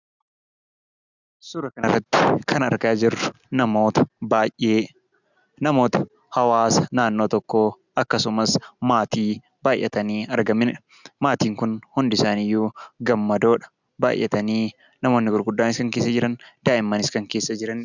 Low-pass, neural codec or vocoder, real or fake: 7.2 kHz; none; real